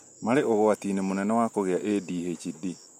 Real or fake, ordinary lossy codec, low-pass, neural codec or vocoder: real; MP3, 64 kbps; 19.8 kHz; none